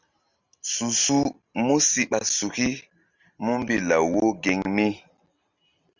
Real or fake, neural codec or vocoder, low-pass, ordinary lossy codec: real; none; 7.2 kHz; Opus, 64 kbps